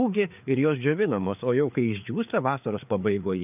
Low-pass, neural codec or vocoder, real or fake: 3.6 kHz; codec, 16 kHz, 4 kbps, FreqCodec, larger model; fake